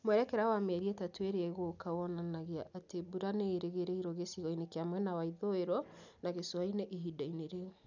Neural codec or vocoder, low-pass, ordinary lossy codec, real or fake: none; 7.2 kHz; none; real